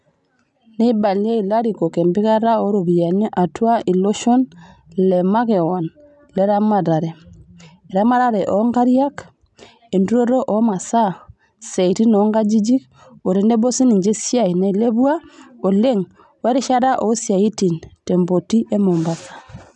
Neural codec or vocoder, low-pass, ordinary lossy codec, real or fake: none; 10.8 kHz; none; real